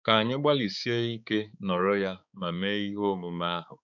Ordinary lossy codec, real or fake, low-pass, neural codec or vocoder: none; fake; 7.2 kHz; codec, 16 kHz, 4 kbps, X-Codec, HuBERT features, trained on balanced general audio